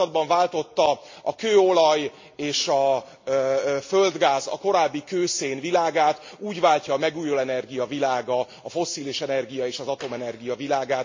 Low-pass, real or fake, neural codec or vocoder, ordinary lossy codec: 7.2 kHz; real; none; MP3, 48 kbps